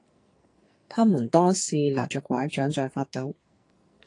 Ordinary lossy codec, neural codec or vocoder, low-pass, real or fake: AAC, 48 kbps; codec, 44.1 kHz, 2.6 kbps, SNAC; 10.8 kHz; fake